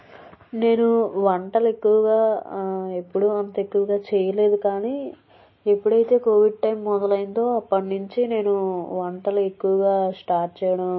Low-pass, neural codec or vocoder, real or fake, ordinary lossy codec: 7.2 kHz; none; real; MP3, 24 kbps